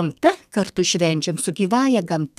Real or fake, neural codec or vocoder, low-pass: fake; codec, 32 kHz, 1.9 kbps, SNAC; 14.4 kHz